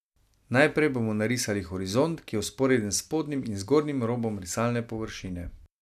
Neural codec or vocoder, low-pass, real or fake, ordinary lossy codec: none; 14.4 kHz; real; none